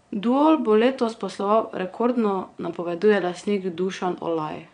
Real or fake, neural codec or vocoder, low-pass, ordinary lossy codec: fake; vocoder, 22.05 kHz, 80 mel bands, Vocos; 9.9 kHz; none